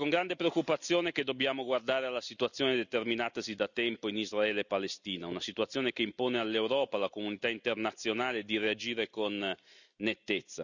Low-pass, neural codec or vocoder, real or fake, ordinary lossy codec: 7.2 kHz; none; real; none